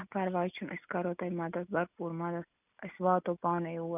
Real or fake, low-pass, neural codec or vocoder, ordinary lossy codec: real; 3.6 kHz; none; none